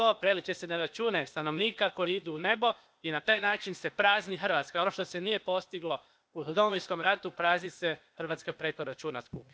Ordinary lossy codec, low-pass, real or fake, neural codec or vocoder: none; none; fake; codec, 16 kHz, 0.8 kbps, ZipCodec